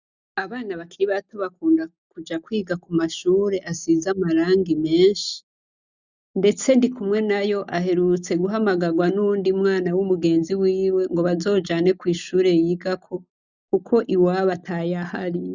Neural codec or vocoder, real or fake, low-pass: none; real; 7.2 kHz